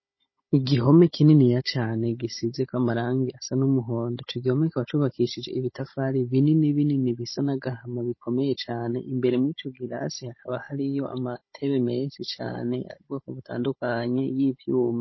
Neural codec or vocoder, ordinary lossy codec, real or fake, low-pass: codec, 16 kHz, 16 kbps, FunCodec, trained on Chinese and English, 50 frames a second; MP3, 24 kbps; fake; 7.2 kHz